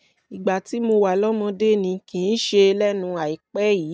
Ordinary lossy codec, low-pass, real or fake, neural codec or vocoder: none; none; real; none